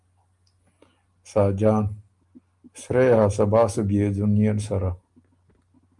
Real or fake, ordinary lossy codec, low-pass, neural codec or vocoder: real; Opus, 32 kbps; 10.8 kHz; none